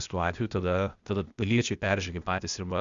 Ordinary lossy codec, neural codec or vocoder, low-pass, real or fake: Opus, 64 kbps; codec, 16 kHz, 0.8 kbps, ZipCodec; 7.2 kHz; fake